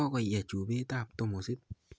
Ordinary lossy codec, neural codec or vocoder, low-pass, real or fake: none; none; none; real